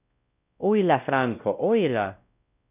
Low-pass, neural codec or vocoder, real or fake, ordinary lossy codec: 3.6 kHz; codec, 16 kHz, 0.5 kbps, X-Codec, WavLM features, trained on Multilingual LibriSpeech; fake; none